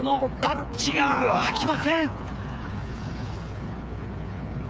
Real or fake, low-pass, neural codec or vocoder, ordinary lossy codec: fake; none; codec, 16 kHz, 4 kbps, FreqCodec, smaller model; none